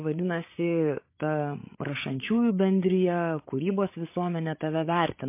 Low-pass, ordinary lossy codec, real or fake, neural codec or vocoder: 3.6 kHz; MP3, 24 kbps; fake; codec, 16 kHz, 8 kbps, FreqCodec, larger model